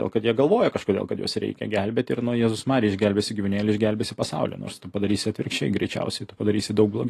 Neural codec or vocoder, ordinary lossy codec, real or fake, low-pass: none; AAC, 48 kbps; real; 14.4 kHz